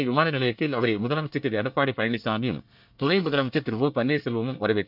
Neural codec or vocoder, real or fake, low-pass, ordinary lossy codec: codec, 24 kHz, 1 kbps, SNAC; fake; 5.4 kHz; none